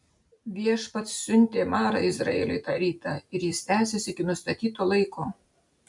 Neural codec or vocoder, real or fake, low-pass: none; real; 10.8 kHz